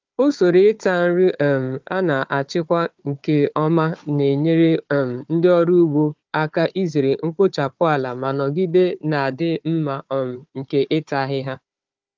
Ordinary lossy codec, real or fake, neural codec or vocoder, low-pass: Opus, 24 kbps; fake; codec, 16 kHz, 4 kbps, FunCodec, trained on Chinese and English, 50 frames a second; 7.2 kHz